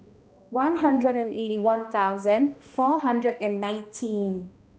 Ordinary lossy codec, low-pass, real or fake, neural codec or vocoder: none; none; fake; codec, 16 kHz, 1 kbps, X-Codec, HuBERT features, trained on balanced general audio